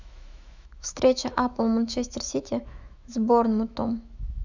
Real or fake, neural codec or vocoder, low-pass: fake; autoencoder, 48 kHz, 128 numbers a frame, DAC-VAE, trained on Japanese speech; 7.2 kHz